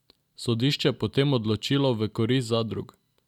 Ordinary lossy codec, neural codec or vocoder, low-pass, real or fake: none; none; 19.8 kHz; real